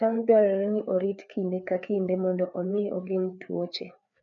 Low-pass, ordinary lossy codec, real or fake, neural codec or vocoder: 7.2 kHz; none; fake; codec, 16 kHz, 4 kbps, FreqCodec, larger model